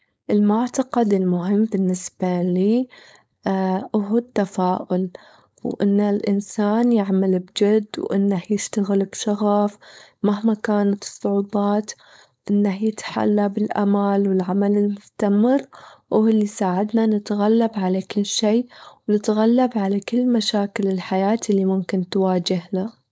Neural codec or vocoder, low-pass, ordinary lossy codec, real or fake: codec, 16 kHz, 4.8 kbps, FACodec; none; none; fake